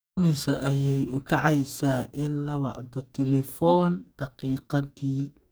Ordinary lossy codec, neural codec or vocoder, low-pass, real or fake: none; codec, 44.1 kHz, 2.6 kbps, DAC; none; fake